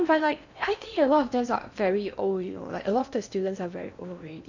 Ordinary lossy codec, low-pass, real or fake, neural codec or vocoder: none; 7.2 kHz; fake; codec, 16 kHz in and 24 kHz out, 0.8 kbps, FocalCodec, streaming, 65536 codes